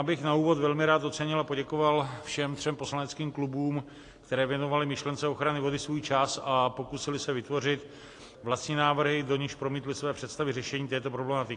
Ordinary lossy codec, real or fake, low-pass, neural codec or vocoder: AAC, 48 kbps; real; 10.8 kHz; none